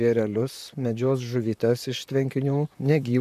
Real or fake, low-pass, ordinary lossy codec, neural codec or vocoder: fake; 14.4 kHz; AAC, 96 kbps; vocoder, 44.1 kHz, 128 mel bands, Pupu-Vocoder